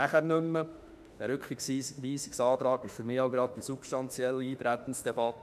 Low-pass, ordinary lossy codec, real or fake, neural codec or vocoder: 14.4 kHz; none; fake; autoencoder, 48 kHz, 32 numbers a frame, DAC-VAE, trained on Japanese speech